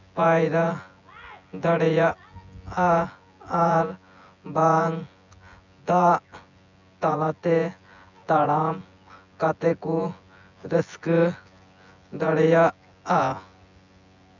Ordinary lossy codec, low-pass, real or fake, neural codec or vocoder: none; 7.2 kHz; fake; vocoder, 24 kHz, 100 mel bands, Vocos